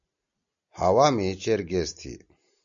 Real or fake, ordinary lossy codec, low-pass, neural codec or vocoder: real; MP3, 64 kbps; 7.2 kHz; none